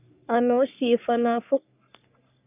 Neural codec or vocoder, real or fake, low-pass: codec, 44.1 kHz, 3.4 kbps, Pupu-Codec; fake; 3.6 kHz